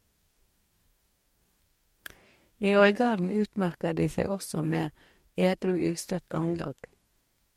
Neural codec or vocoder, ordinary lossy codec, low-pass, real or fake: codec, 44.1 kHz, 2.6 kbps, DAC; MP3, 64 kbps; 19.8 kHz; fake